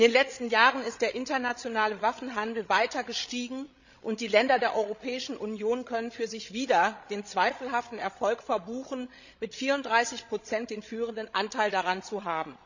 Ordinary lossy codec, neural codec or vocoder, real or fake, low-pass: none; codec, 16 kHz, 16 kbps, FreqCodec, larger model; fake; 7.2 kHz